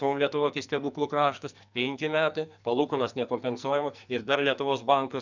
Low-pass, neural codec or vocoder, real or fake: 7.2 kHz; codec, 44.1 kHz, 2.6 kbps, SNAC; fake